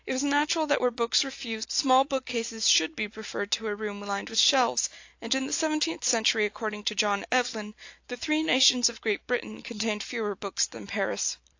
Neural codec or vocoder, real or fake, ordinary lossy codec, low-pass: none; real; AAC, 48 kbps; 7.2 kHz